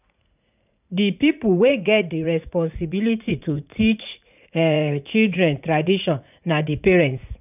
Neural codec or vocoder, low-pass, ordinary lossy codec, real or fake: vocoder, 44.1 kHz, 80 mel bands, Vocos; 3.6 kHz; none; fake